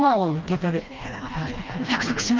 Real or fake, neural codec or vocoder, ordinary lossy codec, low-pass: fake; codec, 16 kHz, 1 kbps, FreqCodec, smaller model; Opus, 24 kbps; 7.2 kHz